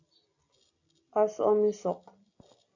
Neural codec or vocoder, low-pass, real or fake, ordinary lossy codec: none; 7.2 kHz; real; AAC, 48 kbps